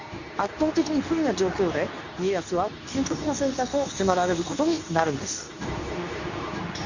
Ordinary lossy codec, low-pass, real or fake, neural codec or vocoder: none; 7.2 kHz; fake; codec, 24 kHz, 0.9 kbps, WavTokenizer, medium speech release version 2